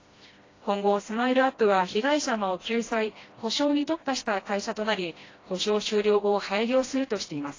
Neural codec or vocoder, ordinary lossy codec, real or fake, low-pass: codec, 16 kHz, 1 kbps, FreqCodec, smaller model; AAC, 32 kbps; fake; 7.2 kHz